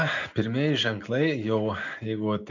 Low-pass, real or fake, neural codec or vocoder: 7.2 kHz; real; none